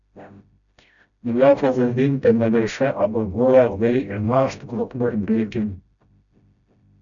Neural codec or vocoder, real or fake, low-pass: codec, 16 kHz, 0.5 kbps, FreqCodec, smaller model; fake; 7.2 kHz